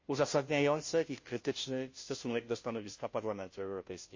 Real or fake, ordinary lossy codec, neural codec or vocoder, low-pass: fake; MP3, 32 kbps; codec, 16 kHz, 0.5 kbps, FunCodec, trained on Chinese and English, 25 frames a second; 7.2 kHz